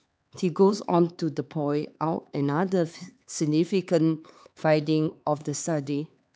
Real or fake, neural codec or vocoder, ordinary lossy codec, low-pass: fake; codec, 16 kHz, 4 kbps, X-Codec, HuBERT features, trained on LibriSpeech; none; none